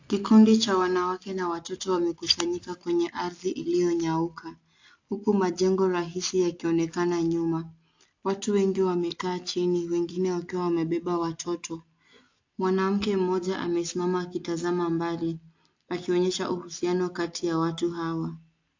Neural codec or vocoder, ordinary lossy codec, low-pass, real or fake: none; AAC, 48 kbps; 7.2 kHz; real